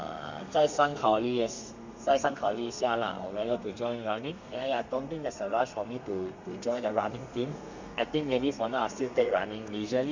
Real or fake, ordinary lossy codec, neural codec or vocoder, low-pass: fake; MP3, 64 kbps; codec, 32 kHz, 1.9 kbps, SNAC; 7.2 kHz